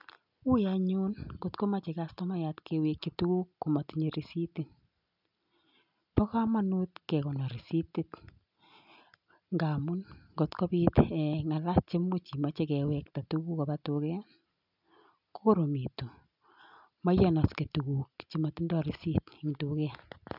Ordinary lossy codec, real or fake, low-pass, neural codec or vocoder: none; real; 5.4 kHz; none